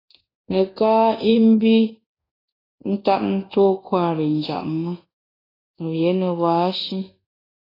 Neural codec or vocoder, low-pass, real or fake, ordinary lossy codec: codec, 24 kHz, 1.2 kbps, DualCodec; 5.4 kHz; fake; AAC, 24 kbps